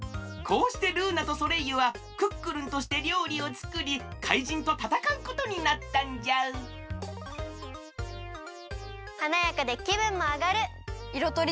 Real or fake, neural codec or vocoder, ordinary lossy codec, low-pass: real; none; none; none